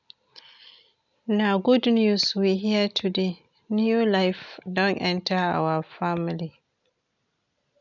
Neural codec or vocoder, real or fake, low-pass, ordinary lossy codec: none; real; 7.2 kHz; none